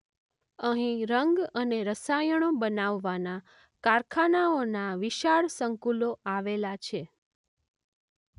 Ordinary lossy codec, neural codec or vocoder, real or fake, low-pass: none; none; real; 10.8 kHz